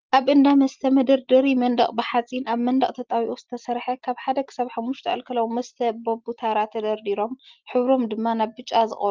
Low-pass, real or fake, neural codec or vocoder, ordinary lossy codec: 7.2 kHz; real; none; Opus, 32 kbps